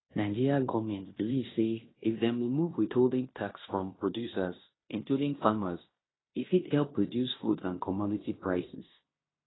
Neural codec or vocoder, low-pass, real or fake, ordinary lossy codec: codec, 16 kHz in and 24 kHz out, 0.9 kbps, LongCat-Audio-Codec, fine tuned four codebook decoder; 7.2 kHz; fake; AAC, 16 kbps